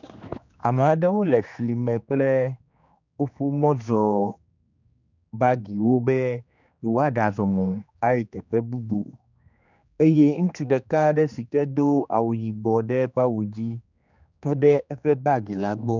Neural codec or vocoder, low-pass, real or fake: codec, 16 kHz, 2 kbps, X-Codec, HuBERT features, trained on general audio; 7.2 kHz; fake